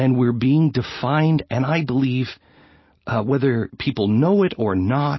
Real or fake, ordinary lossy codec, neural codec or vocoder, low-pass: real; MP3, 24 kbps; none; 7.2 kHz